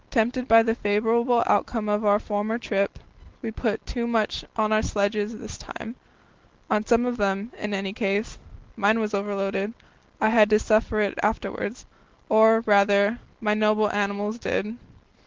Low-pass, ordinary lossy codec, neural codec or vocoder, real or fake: 7.2 kHz; Opus, 16 kbps; none; real